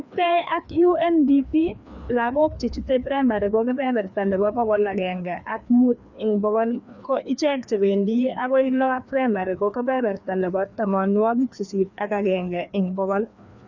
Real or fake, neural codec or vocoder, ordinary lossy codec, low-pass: fake; codec, 16 kHz, 2 kbps, FreqCodec, larger model; none; 7.2 kHz